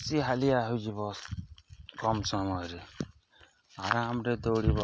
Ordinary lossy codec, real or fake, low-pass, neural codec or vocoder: none; real; none; none